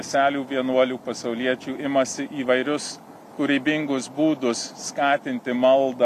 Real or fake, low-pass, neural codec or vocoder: real; 14.4 kHz; none